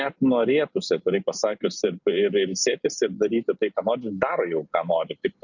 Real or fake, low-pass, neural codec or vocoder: real; 7.2 kHz; none